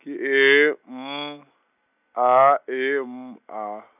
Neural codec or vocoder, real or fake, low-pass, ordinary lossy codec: none; real; 3.6 kHz; none